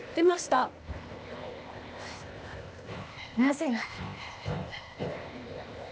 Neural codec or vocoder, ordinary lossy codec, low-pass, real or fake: codec, 16 kHz, 0.8 kbps, ZipCodec; none; none; fake